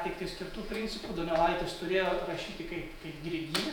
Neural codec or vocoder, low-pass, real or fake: vocoder, 48 kHz, 128 mel bands, Vocos; 19.8 kHz; fake